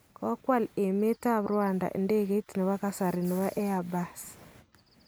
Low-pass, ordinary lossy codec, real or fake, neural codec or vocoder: none; none; real; none